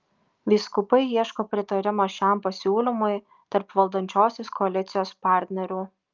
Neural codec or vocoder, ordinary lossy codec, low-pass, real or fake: none; Opus, 24 kbps; 7.2 kHz; real